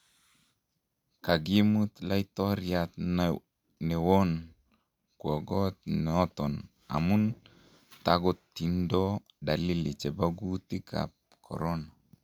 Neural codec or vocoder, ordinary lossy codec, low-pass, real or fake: none; none; 19.8 kHz; real